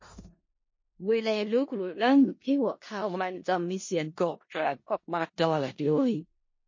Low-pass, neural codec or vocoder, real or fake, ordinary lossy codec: 7.2 kHz; codec, 16 kHz in and 24 kHz out, 0.4 kbps, LongCat-Audio-Codec, four codebook decoder; fake; MP3, 32 kbps